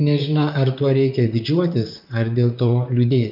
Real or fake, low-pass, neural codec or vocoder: fake; 5.4 kHz; vocoder, 44.1 kHz, 128 mel bands, Pupu-Vocoder